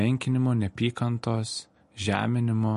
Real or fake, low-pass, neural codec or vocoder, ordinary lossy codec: real; 14.4 kHz; none; MP3, 48 kbps